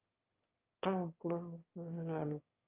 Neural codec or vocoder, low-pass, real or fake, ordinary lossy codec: autoencoder, 22.05 kHz, a latent of 192 numbers a frame, VITS, trained on one speaker; 3.6 kHz; fake; Opus, 24 kbps